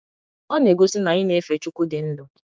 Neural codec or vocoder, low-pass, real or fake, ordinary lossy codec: codec, 16 kHz, 4 kbps, X-Codec, HuBERT features, trained on general audio; none; fake; none